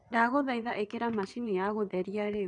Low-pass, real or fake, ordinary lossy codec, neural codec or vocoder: 9.9 kHz; fake; none; vocoder, 22.05 kHz, 80 mel bands, WaveNeXt